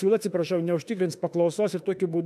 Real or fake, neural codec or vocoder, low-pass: fake; autoencoder, 48 kHz, 32 numbers a frame, DAC-VAE, trained on Japanese speech; 14.4 kHz